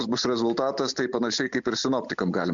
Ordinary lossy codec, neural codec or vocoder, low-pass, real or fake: MP3, 64 kbps; none; 7.2 kHz; real